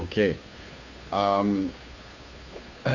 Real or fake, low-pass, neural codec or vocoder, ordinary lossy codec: fake; 7.2 kHz; codec, 16 kHz, 2 kbps, FunCodec, trained on Chinese and English, 25 frames a second; Opus, 64 kbps